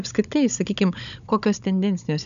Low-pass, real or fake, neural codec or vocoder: 7.2 kHz; fake; codec, 16 kHz, 16 kbps, FunCodec, trained on Chinese and English, 50 frames a second